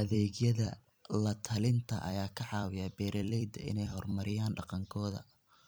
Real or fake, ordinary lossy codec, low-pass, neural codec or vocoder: fake; none; none; vocoder, 44.1 kHz, 128 mel bands every 256 samples, BigVGAN v2